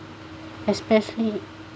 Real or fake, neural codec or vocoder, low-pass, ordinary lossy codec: real; none; none; none